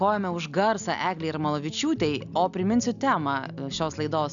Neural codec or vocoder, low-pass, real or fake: none; 7.2 kHz; real